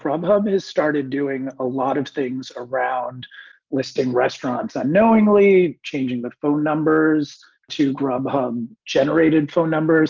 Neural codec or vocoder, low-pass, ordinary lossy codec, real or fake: none; 7.2 kHz; Opus, 24 kbps; real